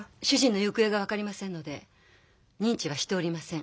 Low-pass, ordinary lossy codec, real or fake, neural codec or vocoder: none; none; real; none